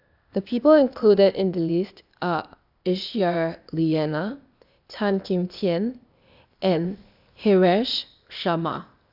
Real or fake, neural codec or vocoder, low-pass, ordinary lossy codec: fake; codec, 16 kHz, 0.8 kbps, ZipCodec; 5.4 kHz; none